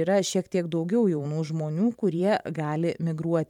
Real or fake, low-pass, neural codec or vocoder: fake; 19.8 kHz; vocoder, 44.1 kHz, 128 mel bands every 512 samples, BigVGAN v2